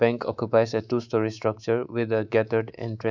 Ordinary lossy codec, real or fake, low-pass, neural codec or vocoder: none; fake; 7.2 kHz; codec, 24 kHz, 3.1 kbps, DualCodec